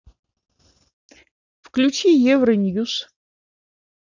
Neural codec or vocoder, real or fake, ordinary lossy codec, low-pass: none; real; none; 7.2 kHz